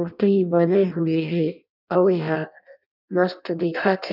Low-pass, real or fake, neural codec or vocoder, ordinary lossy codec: 5.4 kHz; fake; codec, 16 kHz in and 24 kHz out, 0.6 kbps, FireRedTTS-2 codec; none